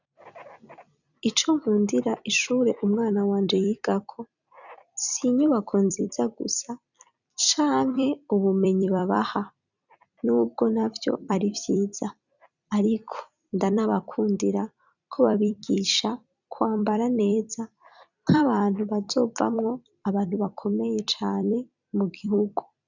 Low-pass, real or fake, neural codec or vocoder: 7.2 kHz; fake; vocoder, 44.1 kHz, 128 mel bands every 256 samples, BigVGAN v2